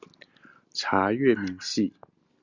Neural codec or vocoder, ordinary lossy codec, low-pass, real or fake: none; Opus, 64 kbps; 7.2 kHz; real